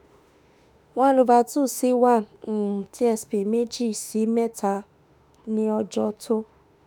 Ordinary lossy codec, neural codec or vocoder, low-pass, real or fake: none; autoencoder, 48 kHz, 32 numbers a frame, DAC-VAE, trained on Japanese speech; none; fake